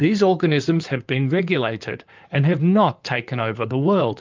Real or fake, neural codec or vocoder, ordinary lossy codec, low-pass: fake; codec, 16 kHz in and 24 kHz out, 2.2 kbps, FireRedTTS-2 codec; Opus, 24 kbps; 7.2 kHz